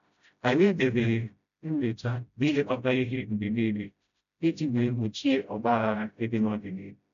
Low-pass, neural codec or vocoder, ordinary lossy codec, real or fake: 7.2 kHz; codec, 16 kHz, 0.5 kbps, FreqCodec, smaller model; none; fake